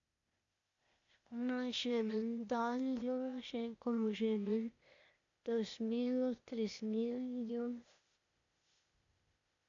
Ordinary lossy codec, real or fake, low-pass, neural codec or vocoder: AAC, 64 kbps; fake; 7.2 kHz; codec, 16 kHz, 0.8 kbps, ZipCodec